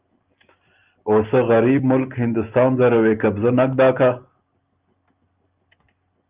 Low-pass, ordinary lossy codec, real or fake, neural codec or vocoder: 3.6 kHz; Opus, 24 kbps; real; none